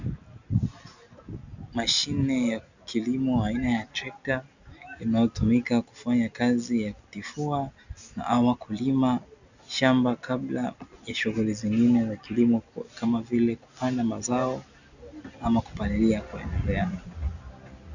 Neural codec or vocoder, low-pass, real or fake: none; 7.2 kHz; real